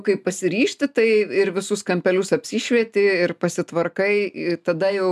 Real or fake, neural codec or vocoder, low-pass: real; none; 14.4 kHz